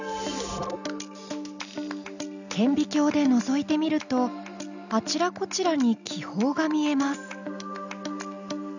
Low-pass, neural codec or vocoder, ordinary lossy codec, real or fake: 7.2 kHz; none; none; real